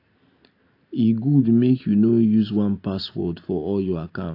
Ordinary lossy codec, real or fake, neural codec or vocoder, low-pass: AAC, 32 kbps; real; none; 5.4 kHz